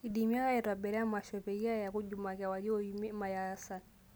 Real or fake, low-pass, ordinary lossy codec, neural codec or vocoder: real; none; none; none